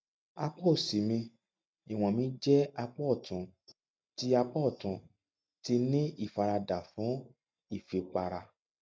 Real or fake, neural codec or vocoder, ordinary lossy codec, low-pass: real; none; none; none